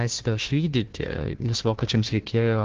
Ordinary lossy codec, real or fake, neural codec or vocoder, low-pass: Opus, 16 kbps; fake; codec, 16 kHz, 1 kbps, FunCodec, trained on Chinese and English, 50 frames a second; 7.2 kHz